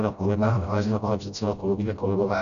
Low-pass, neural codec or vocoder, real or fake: 7.2 kHz; codec, 16 kHz, 0.5 kbps, FreqCodec, smaller model; fake